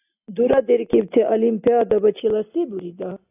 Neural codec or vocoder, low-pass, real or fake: none; 3.6 kHz; real